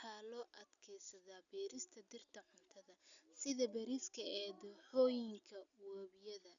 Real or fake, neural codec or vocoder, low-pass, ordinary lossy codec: real; none; 7.2 kHz; none